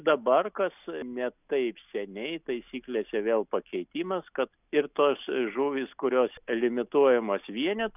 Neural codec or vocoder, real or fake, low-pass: none; real; 3.6 kHz